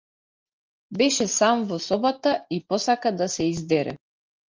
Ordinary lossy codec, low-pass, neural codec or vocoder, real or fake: Opus, 24 kbps; 7.2 kHz; none; real